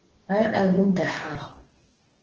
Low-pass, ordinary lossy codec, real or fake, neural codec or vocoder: 7.2 kHz; Opus, 16 kbps; fake; codec, 44.1 kHz, 2.6 kbps, DAC